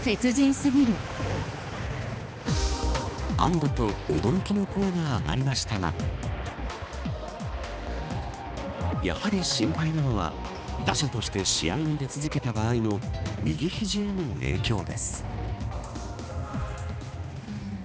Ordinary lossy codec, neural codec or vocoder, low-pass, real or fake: none; codec, 16 kHz, 2 kbps, X-Codec, HuBERT features, trained on balanced general audio; none; fake